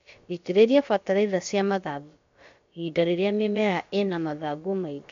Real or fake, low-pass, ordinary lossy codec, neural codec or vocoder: fake; 7.2 kHz; MP3, 48 kbps; codec, 16 kHz, about 1 kbps, DyCAST, with the encoder's durations